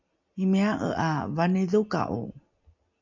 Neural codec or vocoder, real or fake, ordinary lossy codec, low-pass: none; real; MP3, 64 kbps; 7.2 kHz